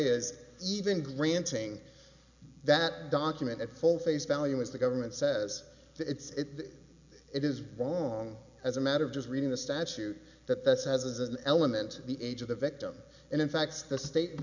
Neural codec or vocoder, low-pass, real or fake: none; 7.2 kHz; real